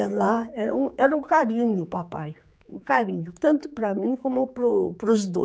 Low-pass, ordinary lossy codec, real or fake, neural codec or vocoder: none; none; fake; codec, 16 kHz, 4 kbps, X-Codec, HuBERT features, trained on general audio